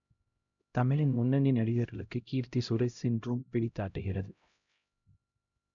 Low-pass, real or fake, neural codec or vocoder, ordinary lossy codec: 7.2 kHz; fake; codec, 16 kHz, 0.5 kbps, X-Codec, HuBERT features, trained on LibriSpeech; none